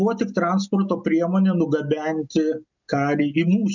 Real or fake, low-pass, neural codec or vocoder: real; 7.2 kHz; none